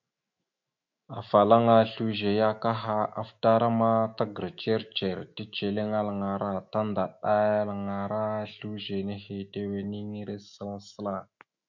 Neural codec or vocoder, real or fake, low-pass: autoencoder, 48 kHz, 128 numbers a frame, DAC-VAE, trained on Japanese speech; fake; 7.2 kHz